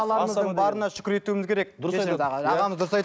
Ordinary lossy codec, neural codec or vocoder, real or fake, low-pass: none; none; real; none